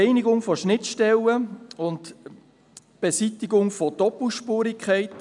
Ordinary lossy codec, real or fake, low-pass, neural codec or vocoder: none; real; 10.8 kHz; none